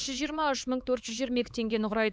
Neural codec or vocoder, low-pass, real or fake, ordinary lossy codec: codec, 16 kHz, 4 kbps, X-Codec, HuBERT features, trained on LibriSpeech; none; fake; none